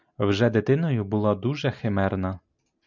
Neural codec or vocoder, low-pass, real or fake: none; 7.2 kHz; real